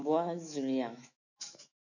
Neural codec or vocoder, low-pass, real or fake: autoencoder, 48 kHz, 128 numbers a frame, DAC-VAE, trained on Japanese speech; 7.2 kHz; fake